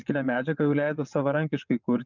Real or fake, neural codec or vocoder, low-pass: fake; vocoder, 22.05 kHz, 80 mel bands, WaveNeXt; 7.2 kHz